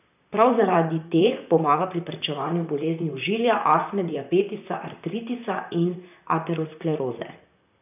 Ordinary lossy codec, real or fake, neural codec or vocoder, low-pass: none; fake; vocoder, 44.1 kHz, 128 mel bands, Pupu-Vocoder; 3.6 kHz